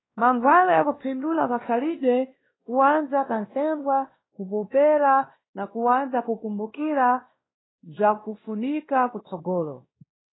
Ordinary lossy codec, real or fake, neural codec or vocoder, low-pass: AAC, 16 kbps; fake; codec, 16 kHz, 1 kbps, X-Codec, WavLM features, trained on Multilingual LibriSpeech; 7.2 kHz